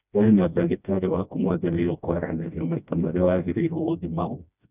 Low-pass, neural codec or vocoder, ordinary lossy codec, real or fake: 3.6 kHz; codec, 16 kHz, 1 kbps, FreqCodec, smaller model; none; fake